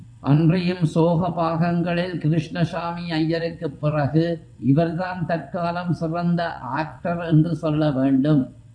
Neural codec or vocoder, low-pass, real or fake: vocoder, 22.05 kHz, 80 mel bands, WaveNeXt; 9.9 kHz; fake